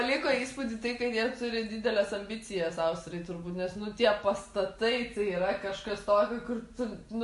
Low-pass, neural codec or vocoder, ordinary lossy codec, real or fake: 14.4 kHz; none; MP3, 48 kbps; real